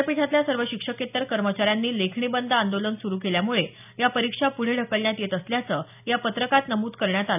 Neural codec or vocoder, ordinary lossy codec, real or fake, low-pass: none; none; real; 3.6 kHz